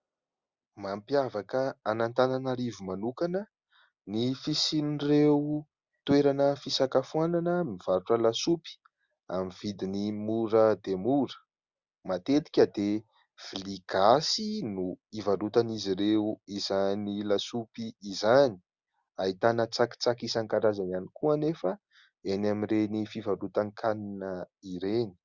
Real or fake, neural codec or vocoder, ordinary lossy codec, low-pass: real; none; Opus, 64 kbps; 7.2 kHz